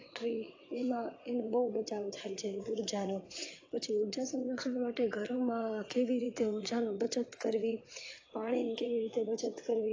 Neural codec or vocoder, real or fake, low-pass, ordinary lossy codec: vocoder, 22.05 kHz, 80 mel bands, WaveNeXt; fake; 7.2 kHz; AAC, 32 kbps